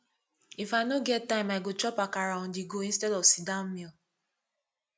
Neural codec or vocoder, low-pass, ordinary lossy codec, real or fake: none; none; none; real